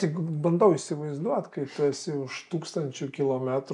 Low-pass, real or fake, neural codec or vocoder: 10.8 kHz; real; none